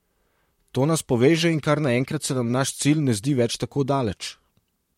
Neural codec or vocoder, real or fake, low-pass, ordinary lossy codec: vocoder, 44.1 kHz, 128 mel bands, Pupu-Vocoder; fake; 19.8 kHz; MP3, 64 kbps